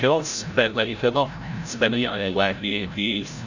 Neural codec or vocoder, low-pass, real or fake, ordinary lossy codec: codec, 16 kHz, 0.5 kbps, FreqCodec, larger model; 7.2 kHz; fake; none